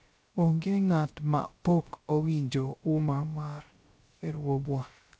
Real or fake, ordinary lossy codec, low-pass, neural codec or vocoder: fake; none; none; codec, 16 kHz, 0.3 kbps, FocalCodec